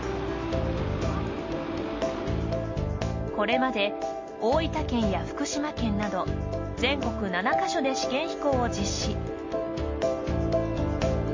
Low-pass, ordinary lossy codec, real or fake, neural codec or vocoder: 7.2 kHz; MP3, 32 kbps; real; none